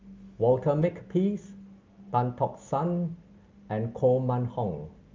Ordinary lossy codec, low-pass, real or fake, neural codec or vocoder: Opus, 32 kbps; 7.2 kHz; real; none